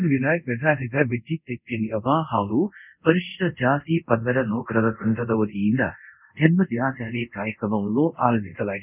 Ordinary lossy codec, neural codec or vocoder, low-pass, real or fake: none; codec, 24 kHz, 0.5 kbps, DualCodec; 3.6 kHz; fake